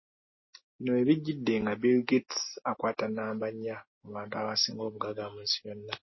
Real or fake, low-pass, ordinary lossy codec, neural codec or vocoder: real; 7.2 kHz; MP3, 24 kbps; none